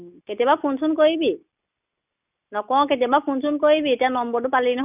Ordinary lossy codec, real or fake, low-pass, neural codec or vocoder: none; real; 3.6 kHz; none